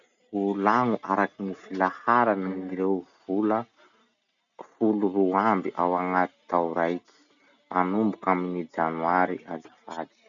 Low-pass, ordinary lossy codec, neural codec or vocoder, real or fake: 7.2 kHz; none; none; real